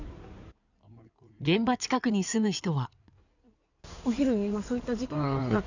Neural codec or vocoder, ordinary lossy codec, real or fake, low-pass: codec, 16 kHz in and 24 kHz out, 2.2 kbps, FireRedTTS-2 codec; none; fake; 7.2 kHz